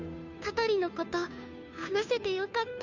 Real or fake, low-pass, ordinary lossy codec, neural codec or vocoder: fake; 7.2 kHz; none; codec, 16 kHz, 2 kbps, FunCodec, trained on Chinese and English, 25 frames a second